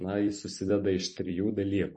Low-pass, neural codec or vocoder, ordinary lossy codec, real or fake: 10.8 kHz; vocoder, 44.1 kHz, 128 mel bands every 256 samples, BigVGAN v2; MP3, 32 kbps; fake